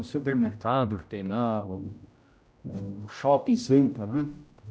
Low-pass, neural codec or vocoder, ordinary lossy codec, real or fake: none; codec, 16 kHz, 0.5 kbps, X-Codec, HuBERT features, trained on general audio; none; fake